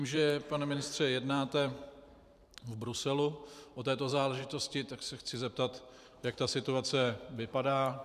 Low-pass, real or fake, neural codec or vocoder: 14.4 kHz; fake; vocoder, 44.1 kHz, 128 mel bands every 512 samples, BigVGAN v2